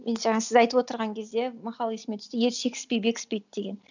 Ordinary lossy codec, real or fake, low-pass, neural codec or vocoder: none; real; 7.2 kHz; none